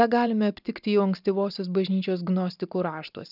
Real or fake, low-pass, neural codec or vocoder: real; 5.4 kHz; none